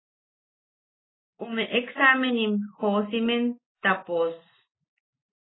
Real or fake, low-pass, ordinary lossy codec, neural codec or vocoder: real; 7.2 kHz; AAC, 16 kbps; none